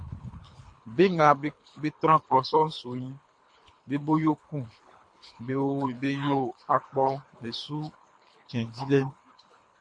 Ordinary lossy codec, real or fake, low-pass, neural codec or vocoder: MP3, 48 kbps; fake; 9.9 kHz; codec, 24 kHz, 3 kbps, HILCodec